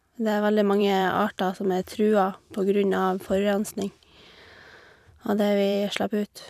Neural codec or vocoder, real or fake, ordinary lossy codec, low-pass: vocoder, 44.1 kHz, 128 mel bands every 512 samples, BigVGAN v2; fake; none; 14.4 kHz